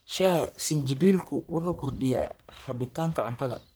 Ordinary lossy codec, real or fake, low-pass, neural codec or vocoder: none; fake; none; codec, 44.1 kHz, 1.7 kbps, Pupu-Codec